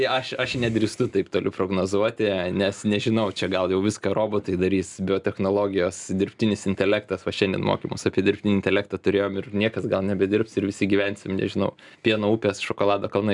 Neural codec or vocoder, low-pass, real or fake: none; 10.8 kHz; real